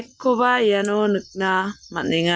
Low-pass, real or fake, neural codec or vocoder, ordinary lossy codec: none; real; none; none